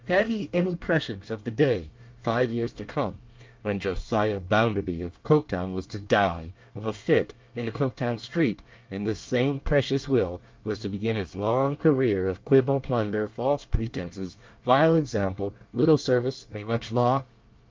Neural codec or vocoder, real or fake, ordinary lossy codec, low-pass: codec, 24 kHz, 1 kbps, SNAC; fake; Opus, 24 kbps; 7.2 kHz